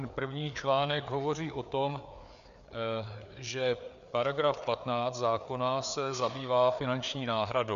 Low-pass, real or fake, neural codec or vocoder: 7.2 kHz; fake; codec, 16 kHz, 4 kbps, FreqCodec, larger model